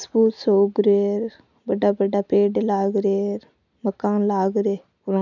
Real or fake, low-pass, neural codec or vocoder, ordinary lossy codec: real; 7.2 kHz; none; none